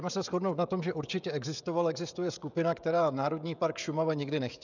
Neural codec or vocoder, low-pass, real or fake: codec, 16 kHz, 16 kbps, FreqCodec, smaller model; 7.2 kHz; fake